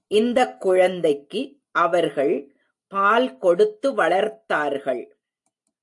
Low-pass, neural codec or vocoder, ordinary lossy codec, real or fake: 10.8 kHz; none; AAC, 64 kbps; real